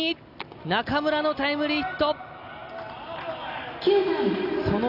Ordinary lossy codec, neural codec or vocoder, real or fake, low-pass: none; none; real; 5.4 kHz